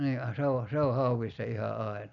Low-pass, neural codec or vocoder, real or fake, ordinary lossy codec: 7.2 kHz; none; real; none